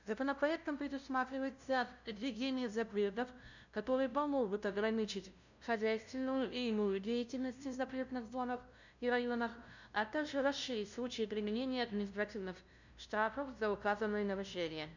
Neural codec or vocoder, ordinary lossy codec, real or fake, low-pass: codec, 16 kHz, 0.5 kbps, FunCodec, trained on LibriTTS, 25 frames a second; none; fake; 7.2 kHz